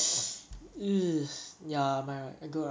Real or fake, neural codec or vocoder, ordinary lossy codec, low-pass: real; none; none; none